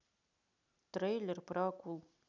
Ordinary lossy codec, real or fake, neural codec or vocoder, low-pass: none; real; none; 7.2 kHz